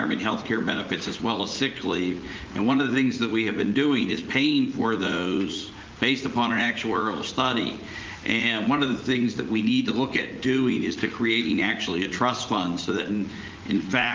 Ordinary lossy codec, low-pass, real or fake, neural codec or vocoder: Opus, 32 kbps; 7.2 kHz; fake; vocoder, 44.1 kHz, 80 mel bands, Vocos